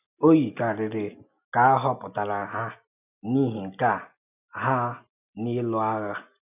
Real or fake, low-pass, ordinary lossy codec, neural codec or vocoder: real; 3.6 kHz; none; none